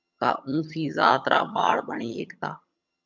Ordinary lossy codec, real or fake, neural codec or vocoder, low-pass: MP3, 64 kbps; fake; vocoder, 22.05 kHz, 80 mel bands, HiFi-GAN; 7.2 kHz